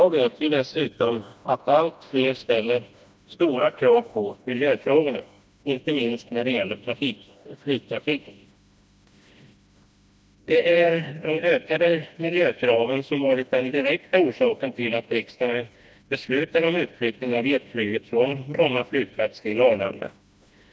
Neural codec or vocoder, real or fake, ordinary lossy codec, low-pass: codec, 16 kHz, 1 kbps, FreqCodec, smaller model; fake; none; none